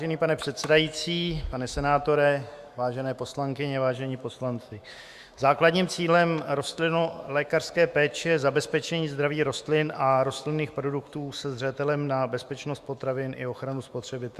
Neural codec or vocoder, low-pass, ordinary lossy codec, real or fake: autoencoder, 48 kHz, 128 numbers a frame, DAC-VAE, trained on Japanese speech; 14.4 kHz; Opus, 64 kbps; fake